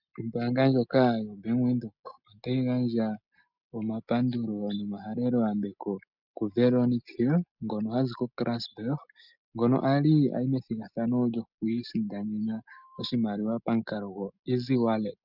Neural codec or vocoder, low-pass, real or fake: none; 5.4 kHz; real